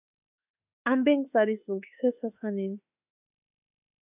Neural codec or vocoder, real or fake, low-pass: autoencoder, 48 kHz, 32 numbers a frame, DAC-VAE, trained on Japanese speech; fake; 3.6 kHz